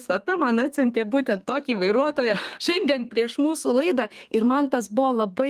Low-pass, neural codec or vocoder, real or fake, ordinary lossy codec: 14.4 kHz; codec, 32 kHz, 1.9 kbps, SNAC; fake; Opus, 32 kbps